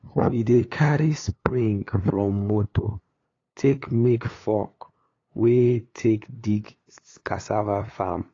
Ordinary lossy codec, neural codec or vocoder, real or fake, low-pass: AAC, 32 kbps; codec, 16 kHz, 2 kbps, FunCodec, trained on LibriTTS, 25 frames a second; fake; 7.2 kHz